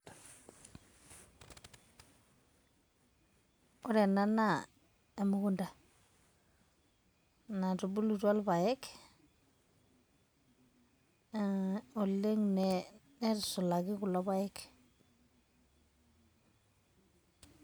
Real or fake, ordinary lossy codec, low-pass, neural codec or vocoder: real; none; none; none